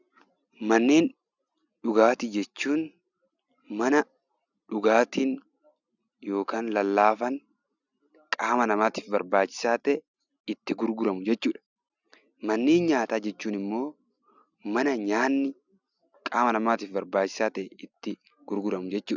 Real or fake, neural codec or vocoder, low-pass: real; none; 7.2 kHz